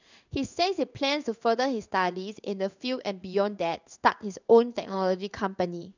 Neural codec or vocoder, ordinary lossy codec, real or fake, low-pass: codec, 16 kHz in and 24 kHz out, 1 kbps, XY-Tokenizer; none; fake; 7.2 kHz